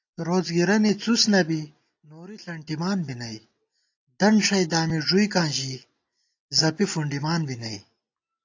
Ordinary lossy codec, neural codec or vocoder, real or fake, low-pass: AAC, 48 kbps; none; real; 7.2 kHz